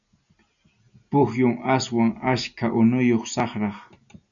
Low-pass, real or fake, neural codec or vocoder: 7.2 kHz; real; none